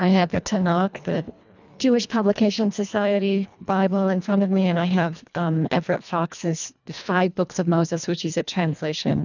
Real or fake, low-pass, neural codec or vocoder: fake; 7.2 kHz; codec, 24 kHz, 1.5 kbps, HILCodec